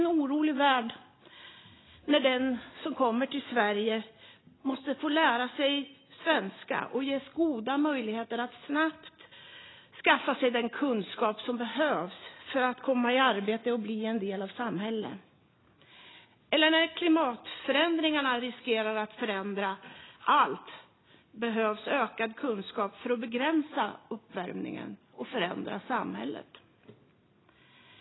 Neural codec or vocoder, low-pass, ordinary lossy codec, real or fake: none; 7.2 kHz; AAC, 16 kbps; real